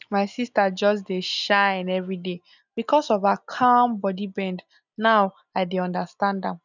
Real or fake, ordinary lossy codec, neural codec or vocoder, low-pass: real; none; none; 7.2 kHz